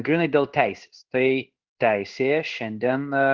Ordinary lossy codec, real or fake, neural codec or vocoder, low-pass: Opus, 16 kbps; fake; codec, 16 kHz in and 24 kHz out, 1 kbps, XY-Tokenizer; 7.2 kHz